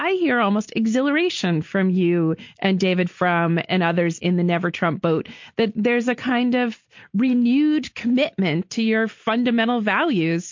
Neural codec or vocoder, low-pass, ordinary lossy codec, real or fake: none; 7.2 kHz; MP3, 48 kbps; real